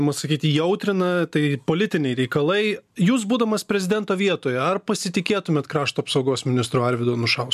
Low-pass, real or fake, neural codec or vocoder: 14.4 kHz; real; none